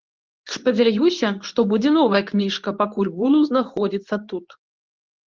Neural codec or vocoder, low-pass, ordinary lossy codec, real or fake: codec, 24 kHz, 0.9 kbps, WavTokenizer, medium speech release version 2; 7.2 kHz; Opus, 32 kbps; fake